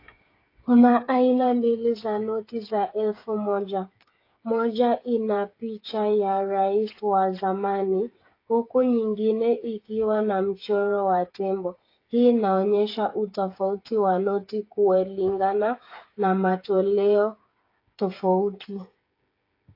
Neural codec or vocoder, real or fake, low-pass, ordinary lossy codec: codec, 16 kHz, 8 kbps, FreqCodec, smaller model; fake; 5.4 kHz; AAC, 32 kbps